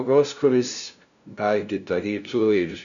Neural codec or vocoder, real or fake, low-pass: codec, 16 kHz, 0.5 kbps, FunCodec, trained on LibriTTS, 25 frames a second; fake; 7.2 kHz